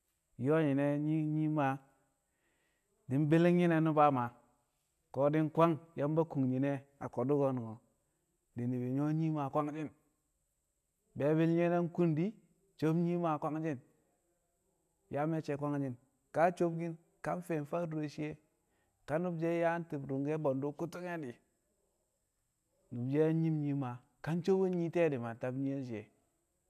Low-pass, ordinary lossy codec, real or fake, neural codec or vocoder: 14.4 kHz; none; real; none